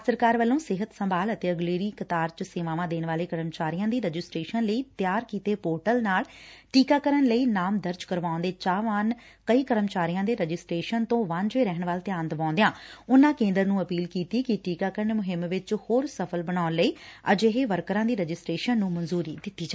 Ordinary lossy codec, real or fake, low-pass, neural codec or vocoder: none; real; none; none